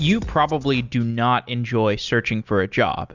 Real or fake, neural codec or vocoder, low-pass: real; none; 7.2 kHz